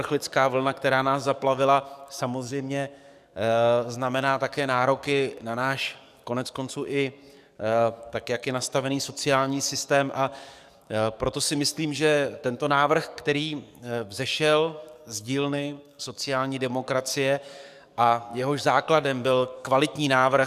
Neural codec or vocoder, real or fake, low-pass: codec, 44.1 kHz, 7.8 kbps, DAC; fake; 14.4 kHz